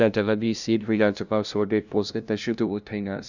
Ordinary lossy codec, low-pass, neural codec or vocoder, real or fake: none; 7.2 kHz; codec, 16 kHz, 0.5 kbps, FunCodec, trained on LibriTTS, 25 frames a second; fake